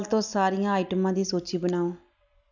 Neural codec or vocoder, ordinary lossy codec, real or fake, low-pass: none; none; real; 7.2 kHz